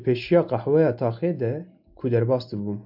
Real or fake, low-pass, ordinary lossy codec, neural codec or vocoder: real; 5.4 kHz; AAC, 48 kbps; none